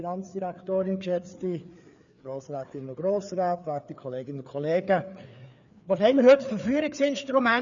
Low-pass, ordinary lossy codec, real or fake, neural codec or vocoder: 7.2 kHz; none; fake; codec, 16 kHz, 4 kbps, FreqCodec, larger model